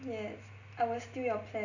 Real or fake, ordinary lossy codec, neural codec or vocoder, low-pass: real; none; none; 7.2 kHz